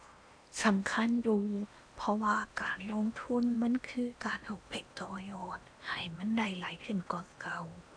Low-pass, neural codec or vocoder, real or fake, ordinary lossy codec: 9.9 kHz; codec, 16 kHz in and 24 kHz out, 0.8 kbps, FocalCodec, streaming, 65536 codes; fake; none